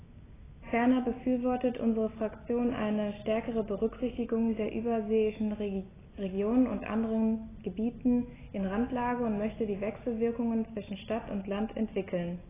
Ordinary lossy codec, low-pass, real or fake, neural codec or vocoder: AAC, 16 kbps; 3.6 kHz; real; none